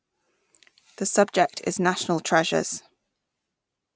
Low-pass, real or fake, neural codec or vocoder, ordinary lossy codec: none; real; none; none